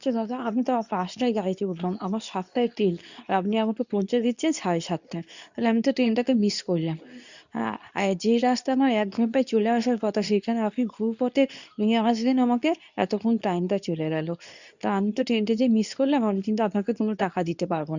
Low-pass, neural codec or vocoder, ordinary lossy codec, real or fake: 7.2 kHz; codec, 24 kHz, 0.9 kbps, WavTokenizer, medium speech release version 2; none; fake